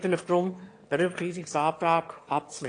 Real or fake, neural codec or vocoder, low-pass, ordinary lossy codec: fake; autoencoder, 22.05 kHz, a latent of 192 numbers a frame, VITS, trained on one speaker; 9.9 kHz; AAC, 48 kbps